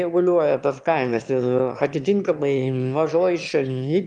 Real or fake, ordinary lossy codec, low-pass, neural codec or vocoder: fake; Opus, 32 kbps; 9.9 kHz; autoencoder, 22.05 kHz, a latent of 192 numbers a frame, VITS, trained on one speaker